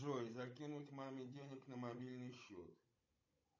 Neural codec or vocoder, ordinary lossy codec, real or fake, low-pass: codec, 16 kHz, 16 kbps, FunCodec, trained on Chinese and English, 50 frames a second; MP3, 32 kbps; fake; 7.2 kHz